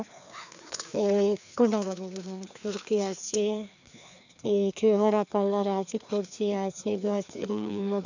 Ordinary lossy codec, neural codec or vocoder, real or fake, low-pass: none; codec, 16 kHz, 2 kbps, FreqCodec, larger model; fake; 7.2 kHz